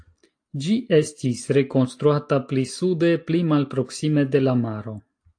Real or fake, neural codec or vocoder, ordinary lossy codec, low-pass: real; none; AAC, 48 kbps; 9.9 kHz